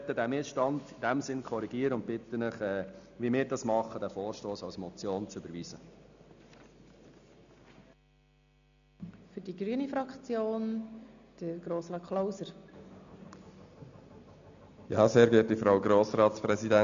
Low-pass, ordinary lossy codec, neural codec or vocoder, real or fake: 7.2 kHz; none; none; real